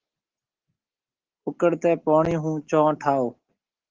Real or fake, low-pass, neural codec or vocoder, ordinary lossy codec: real; 7.2 kHz; none; Opus, 16 kbps